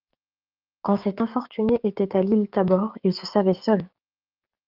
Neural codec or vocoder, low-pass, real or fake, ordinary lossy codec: codec, 16 kHz, 6 kbps, DAC; 5.4 kHz; fake; Opus, 24 kbps